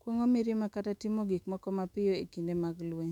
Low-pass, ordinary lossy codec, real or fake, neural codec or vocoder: 19.8 kHz; none; fake; autoencoder, 48 kHz, 128 numbers a frame, DAC-VAE, trained on Japanese speech